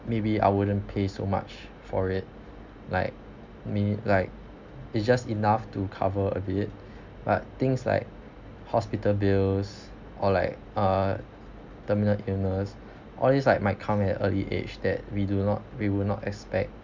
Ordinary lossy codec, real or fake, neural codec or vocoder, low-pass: MP3, 64 kbps; real; none; 7.2 kHz